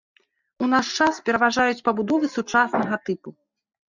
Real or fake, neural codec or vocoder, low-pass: real; none; 7.2 kHz